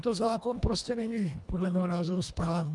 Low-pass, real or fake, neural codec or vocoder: 10.8 kHz; fake; codec, 24 kHz, 1.5 kbps, HILCodec